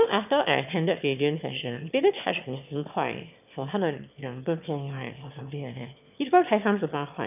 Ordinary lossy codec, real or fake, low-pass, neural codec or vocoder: none; fake; 3.6 kHz; autoencoder, 22.05 kHz, a latent of 192 numbers a frame, VITS, trained on one speaker